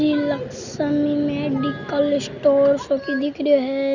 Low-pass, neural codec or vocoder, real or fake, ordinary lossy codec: 7.2 kHz; none; real; none